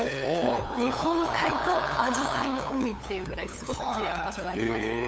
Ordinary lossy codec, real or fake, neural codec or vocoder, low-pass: none; fake; codec, 16 kHz, 8 kbps, FunCodec, trained on LibriTTS, 25 frames a second; none